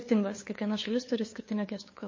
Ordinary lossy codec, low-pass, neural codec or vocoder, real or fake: MP3, 32 kbps; 7.2 kHz; codec, 16 kHz, 2 kbps, FunCodec, trained on LibriTTS, 25 frames a second; fake